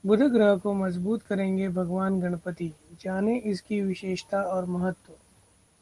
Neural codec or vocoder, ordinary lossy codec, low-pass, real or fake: none; Opus, 24 kbps; 10.8 kHz; real